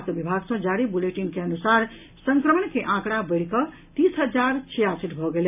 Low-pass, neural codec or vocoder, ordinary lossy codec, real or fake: 3.6 kHz; none; none; real